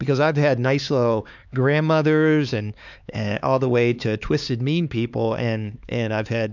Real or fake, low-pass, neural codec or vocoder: fake; 7.2 kHz; codec, 16 kHz, 4 kbps, X-Codec, HuBERT features, trained on LibriSpeech